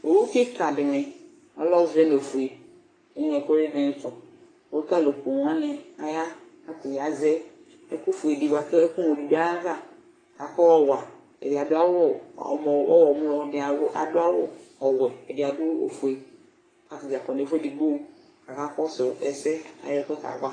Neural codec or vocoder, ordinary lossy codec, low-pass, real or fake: codec, 44.1 kHz, 3.4 kbps, Pupu-Codec; AAC, 32 kbps; 9.9 kHz; fake